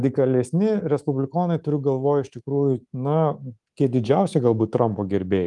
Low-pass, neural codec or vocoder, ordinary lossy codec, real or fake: 10.8 kHz; codec, 24 kHz, 3.1 kbps, DualCodec; Opus, 24 kbps; fake